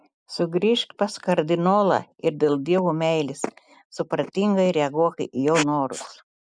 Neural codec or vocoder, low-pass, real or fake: none; 9.9 kHz; real